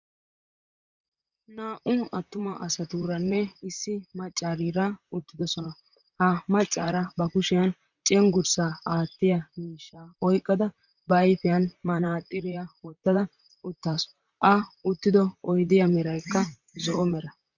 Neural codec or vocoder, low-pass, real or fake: vocoder, 44.1 kHz, 128 mel bands, Pupu-Vocoder; 7.2 kHz; fake